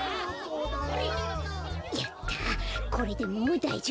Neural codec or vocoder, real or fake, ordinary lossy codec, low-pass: none; real; none; none